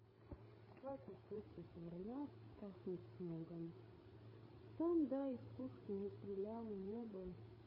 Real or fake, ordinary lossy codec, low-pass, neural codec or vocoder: fake; MP3, 24 kbps; 5.4 kHz; codec, 16 kHz, 8 kbps, FreqCodec, larger model